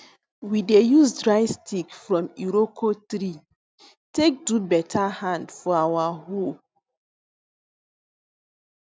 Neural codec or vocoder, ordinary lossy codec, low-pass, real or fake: none; none; none; real